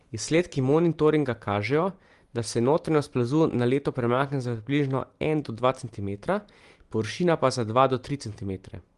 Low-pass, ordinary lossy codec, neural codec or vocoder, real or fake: 10.8 kHz; Opus, 24 kbps; none; real